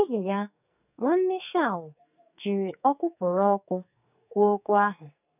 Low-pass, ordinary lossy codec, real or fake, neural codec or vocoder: 3.6 kHz; none; fake; codec, 44.1 kHz, 2.6 kbps, SNAC